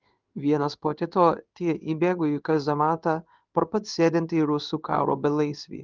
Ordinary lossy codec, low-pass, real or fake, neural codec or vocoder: Opus, 32 kbps; 7.2 kHz; fake; codec, 16 kHz in and 24 kHz out, 1 kbps, XY-Tokenizer